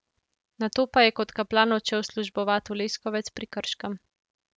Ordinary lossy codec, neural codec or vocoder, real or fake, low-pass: none; none; real; none